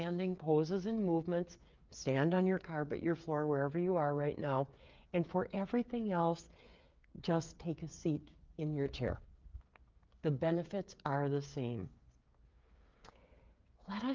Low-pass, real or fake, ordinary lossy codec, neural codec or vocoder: 7.2 kHz; fake; Opus, 24 kbps; codec, 16 kHz, 8 kbps, FreqCodec, smaller model